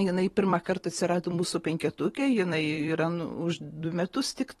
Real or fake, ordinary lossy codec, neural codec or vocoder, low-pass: fake; AAC, 32 kbps; vocoder, 44.1 kHz, 128 mel bands every 256 samples, BigVGAN v2; 19.8 kHz